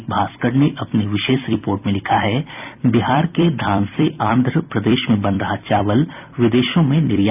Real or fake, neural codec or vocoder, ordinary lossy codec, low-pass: fake; vocoder, 44.1 kHz, 128 mel bands every 256 samples, BigVGAN v2; none; 3.6 kHz